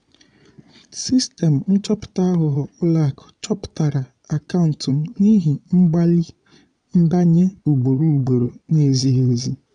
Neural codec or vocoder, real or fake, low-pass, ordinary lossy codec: vocoder, 22.05 kHz, 80 mel bands, Vocos; fake; 9.9 kHz; none